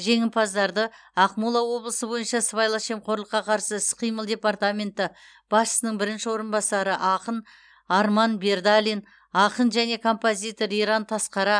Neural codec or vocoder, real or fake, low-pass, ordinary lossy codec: none; real; 9.9 kHz; none